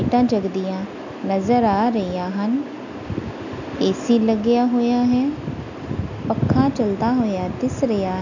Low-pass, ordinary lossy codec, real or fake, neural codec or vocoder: 7.2 kHz; none; real; none